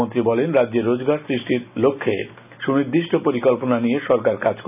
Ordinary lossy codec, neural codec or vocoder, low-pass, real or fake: none; none; 3.6 kHz; real